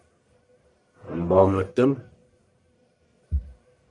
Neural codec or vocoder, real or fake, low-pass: codec, 44.1 kHz, 1.7 kbps, Pupu-Codec; fake; 10.8 kHz